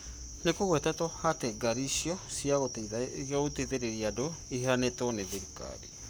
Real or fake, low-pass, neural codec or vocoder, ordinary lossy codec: fake; none; codec, 44.1 kHz, 7.8 kbps, Pupu-Codec; none